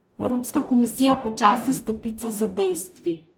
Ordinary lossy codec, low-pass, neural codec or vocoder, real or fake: none; 19.8 kHz; codec, 44.1 kHz, 0.9 kbps, DAC; fake